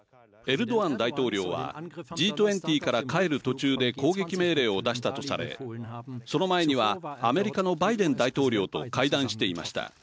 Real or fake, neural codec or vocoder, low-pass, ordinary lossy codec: real; none; none; none